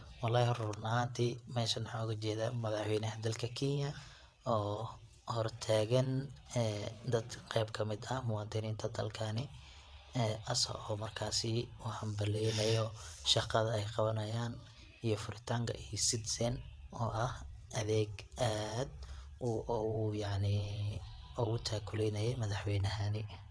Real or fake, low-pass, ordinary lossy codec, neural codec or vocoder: fake; none; none; vocoder, 22.05 kHz, 80 mel bands, WaveNeXt